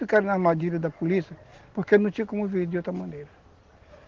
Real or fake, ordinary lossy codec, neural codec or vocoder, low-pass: real; Opus, 16 kbps; none; 7.2 kHz